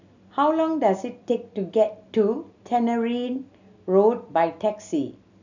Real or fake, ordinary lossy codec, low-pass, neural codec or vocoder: real; none; 7.2 kHz; none